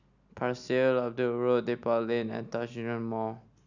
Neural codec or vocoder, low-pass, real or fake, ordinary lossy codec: none; 7.2 kHz; real; none